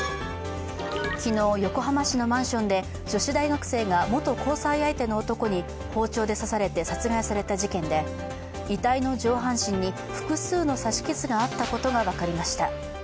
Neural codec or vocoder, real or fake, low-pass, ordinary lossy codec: none; real; none; none